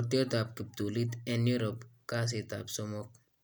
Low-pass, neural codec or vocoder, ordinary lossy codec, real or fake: none; none; none; real